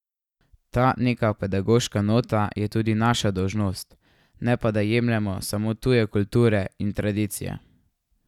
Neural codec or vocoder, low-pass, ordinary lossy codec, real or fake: none; 19.8 kHz; none; real